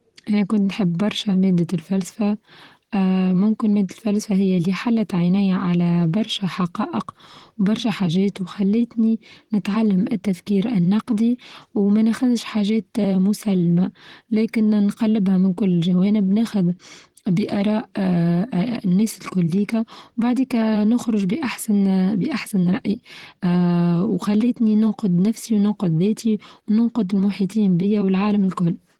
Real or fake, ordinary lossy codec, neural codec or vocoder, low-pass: fake; Opus, 16 kbps; vocoder, 44.1 kHz, 128 mel bands, Pupu-Vocoder; 19.8 kHz